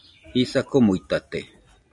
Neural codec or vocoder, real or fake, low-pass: none; real; 10.8 kHz